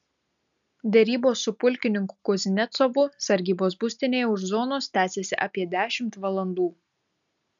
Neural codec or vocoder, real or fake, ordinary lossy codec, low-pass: none; real; MP3, 96 kbps; 7.2 kHz